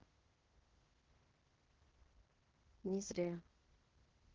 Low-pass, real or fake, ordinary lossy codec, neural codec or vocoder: 7.2 kHz; fake; Opus, 16 kbps; codec, 16 kHz in and 24 kHz out, 0.9 kbps, LongCat-Audio-Codec, fine tuned four codebook decoder